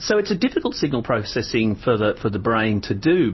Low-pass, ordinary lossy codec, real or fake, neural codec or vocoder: 7.2 kHz; MP3, 24 kbps; real; none